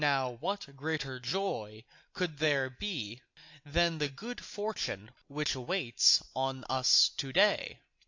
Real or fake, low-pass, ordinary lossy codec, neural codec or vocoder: real; 7.2 kHz; AAC, 48 kbps; none